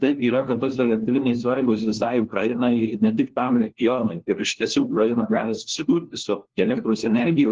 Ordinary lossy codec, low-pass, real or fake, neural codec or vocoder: Opus, 16 kbps; 7.2 kHz; fake; codec, 16 kHz, 1 kbps, FunCodec, trained on LibriTTS, 50 frames a second